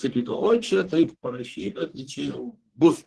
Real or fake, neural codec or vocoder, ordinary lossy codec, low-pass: fake; codec, 44.1 kHz, 1.7 kbps, Pupu-Codec; Opus, 16 kbps; 10.8 kHz